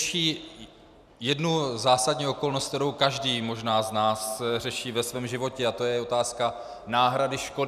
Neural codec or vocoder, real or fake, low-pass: none; real; 14.4 kHz